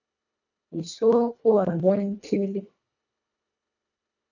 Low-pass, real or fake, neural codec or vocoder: 7.2 kHz; fake; codec, 24 kHz, 1.5 kbps, HILCodec